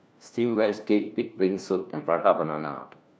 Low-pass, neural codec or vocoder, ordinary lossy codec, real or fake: none; codec, 16 kHz, 1 kbps, FunCodec, trained on LibriTTS, 50 frames a second; none; fake